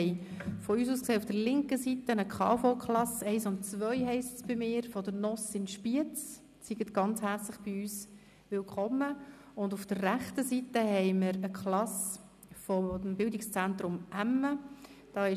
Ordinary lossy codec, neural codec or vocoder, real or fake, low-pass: none; none; real; 14.4 kHz